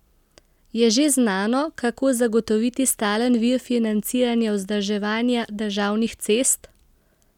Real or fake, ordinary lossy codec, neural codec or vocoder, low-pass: real; none; none; 19.8 kHz